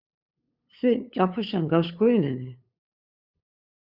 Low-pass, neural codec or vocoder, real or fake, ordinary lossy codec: 5.4 kHz; codec, 16 kHz, 8 kbps, FunCodec, trained on LibriTTS, 25 frames a second; fake; Opus, 64 kbps